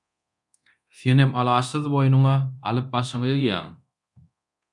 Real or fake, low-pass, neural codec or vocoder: fake; 10.8 kHz; codec, 24 kHz, 0.9 kbps, DualCodec